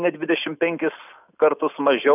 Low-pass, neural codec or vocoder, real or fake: 3.6 kHz; none; real